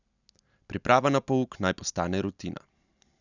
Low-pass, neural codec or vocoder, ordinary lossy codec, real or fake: 7.2 kHz; none; none; real